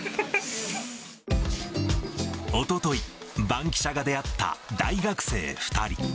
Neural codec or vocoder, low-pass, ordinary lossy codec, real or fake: none; none; none; real